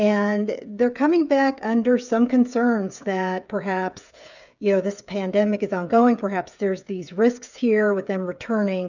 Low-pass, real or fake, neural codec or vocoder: 7.2 kHz; fake; codec, 16 kHz, 8 kbps, FreqCodec, smaller model